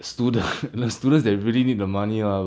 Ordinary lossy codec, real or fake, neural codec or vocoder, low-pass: none; real; none; none